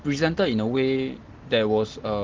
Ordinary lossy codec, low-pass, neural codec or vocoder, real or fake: Opus, 16 kbps; 7.2 kHz; none; real